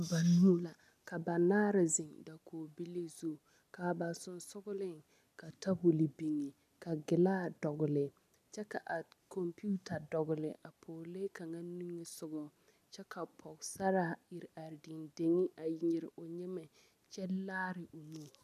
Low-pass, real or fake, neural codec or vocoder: 14.4 kHz; real; none